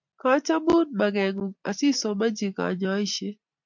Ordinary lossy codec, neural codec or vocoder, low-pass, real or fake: MP3, 64 kbps; none; 7.2 kHz; real